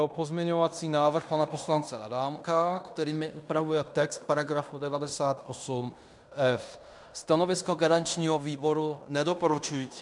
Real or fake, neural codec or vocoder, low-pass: fake; codec, 16 kHz in and 24 kHz out, 0.9 kbps, LongCat-Audio-Codec, fine tuned four codebook decoder; 10.8 kHz